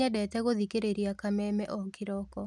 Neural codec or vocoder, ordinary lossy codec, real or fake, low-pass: none; none; real; none